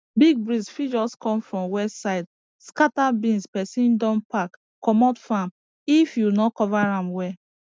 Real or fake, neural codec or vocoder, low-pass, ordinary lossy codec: real; none; none; none